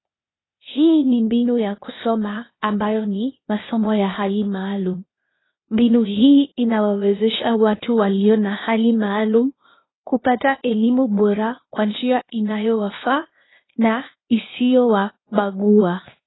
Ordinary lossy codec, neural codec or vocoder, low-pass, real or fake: AAC, 16 kbps; codec, 16 kHz, 0.8 kbps, ZipCodec; 7.2 kHz; fake